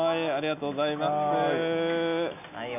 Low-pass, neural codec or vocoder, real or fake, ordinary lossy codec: 3.6 kHz; none; real; none